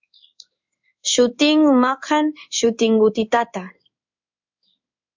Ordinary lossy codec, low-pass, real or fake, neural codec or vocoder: MP3, 64 kbps; 7.2 kHz; fake; codec, 16 kHz in and 24 kHz out, 1 kbps, XY-Tokenizer